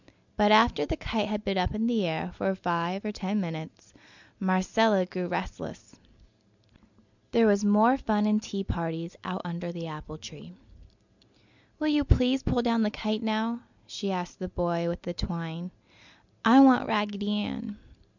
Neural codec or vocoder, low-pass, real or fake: none; 7.2 kHz; real